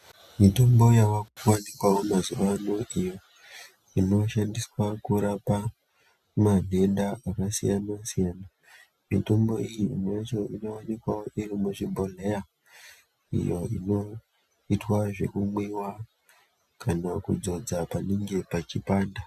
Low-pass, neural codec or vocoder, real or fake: 14.4 kHz; none; real